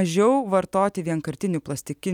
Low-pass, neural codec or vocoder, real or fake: 19.8 kHz; none; real